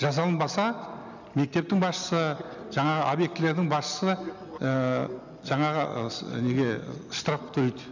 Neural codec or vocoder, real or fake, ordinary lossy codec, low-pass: none; real; none; 7.2 kHz